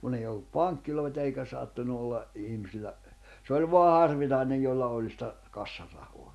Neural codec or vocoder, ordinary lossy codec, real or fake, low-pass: none; none; real; none